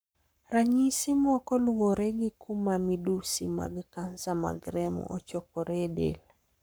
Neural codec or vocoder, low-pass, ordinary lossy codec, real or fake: codec, 44.1 kHz, 7.8 kbps, Pupu-Codec; none; none; fake